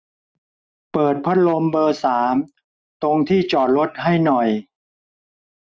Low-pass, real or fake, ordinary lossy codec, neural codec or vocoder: none; real; none; none